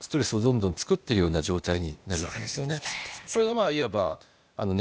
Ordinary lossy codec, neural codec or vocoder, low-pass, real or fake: none; codec, 16 kHz, 0.8 kbps, ZipCodec; none; fake